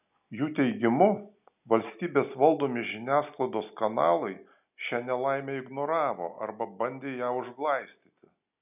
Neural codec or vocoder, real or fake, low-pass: none; real; 3.6 kHz